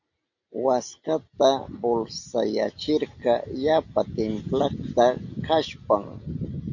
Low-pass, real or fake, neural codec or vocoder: 7.2 kHz; real; none